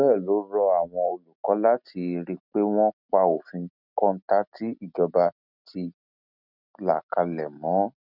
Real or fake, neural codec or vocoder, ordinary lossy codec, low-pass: real; none; none; 5.4 kHz